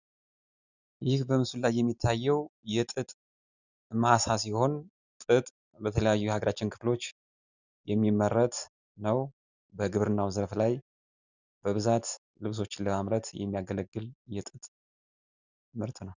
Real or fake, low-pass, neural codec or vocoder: real; 7.2 kHz; none